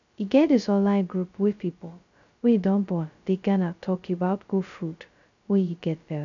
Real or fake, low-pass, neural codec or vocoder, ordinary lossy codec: fake; 7.2 kHz; codec, 16 kHz, 0.2 kbps, FocalCodec; none